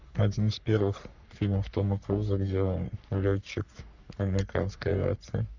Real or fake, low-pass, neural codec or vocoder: fake; 7.2 kHz; codec, 44.1 kHz, 3.4 kbps, Pupu-Codec